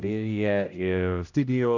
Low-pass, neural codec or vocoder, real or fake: 7.2 kHz; codec, 16 kHz, 0.5 kbps, X-Codec, HuBERT features, trained on general audio; fake